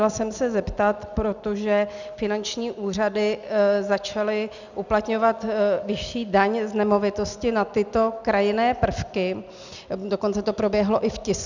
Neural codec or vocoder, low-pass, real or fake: none; 7.2 kHz; real